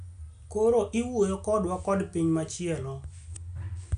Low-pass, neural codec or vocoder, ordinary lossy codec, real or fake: 9.9 kHz; none; none; real